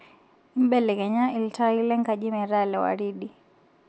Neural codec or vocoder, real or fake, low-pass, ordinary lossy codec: none; real; none; none